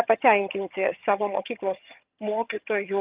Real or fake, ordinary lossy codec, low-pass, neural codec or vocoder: fake; Opus, 32 kbps; 3.6 kHz; vocoder, 22.05 kHz, 80 mel bands, HiFi-GAN